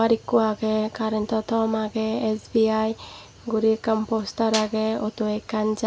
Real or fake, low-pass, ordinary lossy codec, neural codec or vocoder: real; none; none; none